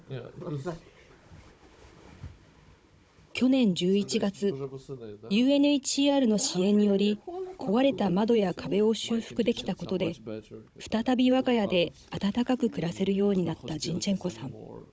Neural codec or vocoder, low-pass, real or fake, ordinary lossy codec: codec, 16 kHz, 16 kbps, FunCodec, trained on Chinese and English, 50 frames a second; none; fake; none